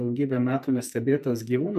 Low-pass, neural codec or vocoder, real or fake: 14.4 kHz; codec, 44.1 kHz, 2.6 kbps, SNAC; fake